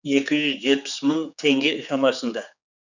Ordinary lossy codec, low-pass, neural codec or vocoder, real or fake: none; 7.2 kHz; codec, 16 kHz, 4 kbps, X-Codec, HuBERT features, trained on general audio; fake